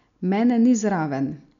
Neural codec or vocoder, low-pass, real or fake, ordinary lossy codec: none; 7.2 kHz; real; none